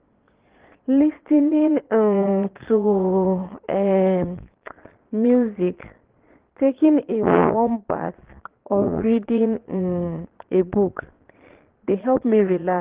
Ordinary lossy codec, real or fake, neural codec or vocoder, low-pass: Opus, 16 kbps; fake; vocoder, 22.05 kHz, 80 mel bands, Vocos; 3.6 kHz